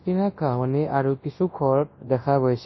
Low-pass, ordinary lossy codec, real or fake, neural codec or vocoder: 7.2 kHz; MP3, 24 kbps; fake; codec, 24 kHz, 0.9 kbps, WavTokenizer, large speech release